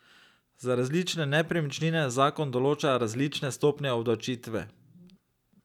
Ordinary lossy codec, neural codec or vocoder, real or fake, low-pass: none; none; real; 19.8 kHz